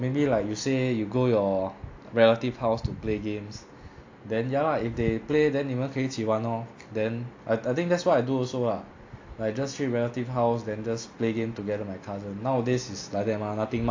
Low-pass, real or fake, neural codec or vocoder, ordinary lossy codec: 7.2 kHz; real; none; AAC, 48 kbps